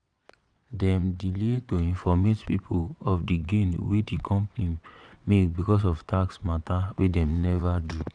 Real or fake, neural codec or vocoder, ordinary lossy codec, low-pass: fake; vocoder, 22.05 kHz, 80 mel bands, WaveNeXt; none; 9.9 kHz